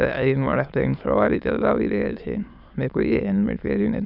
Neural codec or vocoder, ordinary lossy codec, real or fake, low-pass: autoencoder, 22.05 kHz, a latent of 192 numbers a frame, VITS, trained on many speakers; none; fake; 5.4 kHz